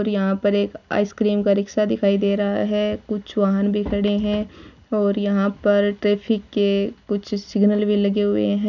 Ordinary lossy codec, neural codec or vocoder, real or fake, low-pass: none; none; real; 7.2 kHz